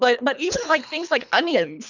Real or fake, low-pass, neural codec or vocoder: fake; 7.2 kHz; codec, 24 kHz, 3 kbps, HILCodec